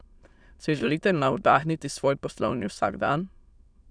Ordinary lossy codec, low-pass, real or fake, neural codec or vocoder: none; 9.9 kHz; fake; autoencoder, 22.05 kHz, a latent of 192 numbers a frame, VITS, trained on many speakers